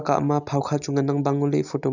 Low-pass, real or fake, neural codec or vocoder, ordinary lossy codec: 7.2 kHz; real; none; none